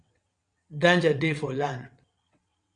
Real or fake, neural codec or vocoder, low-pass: fake; vocoder, 22.05 kHz, 80 mel bands, WaveNeXt; 9.9 kHz